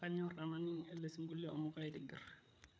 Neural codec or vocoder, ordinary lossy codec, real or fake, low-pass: codec, 16 kHz, 8 kbps, FreqCodec, larger model; none; fake; none